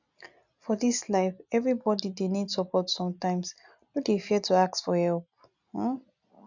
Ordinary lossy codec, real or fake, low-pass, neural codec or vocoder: none; real; 7.2 kHz; none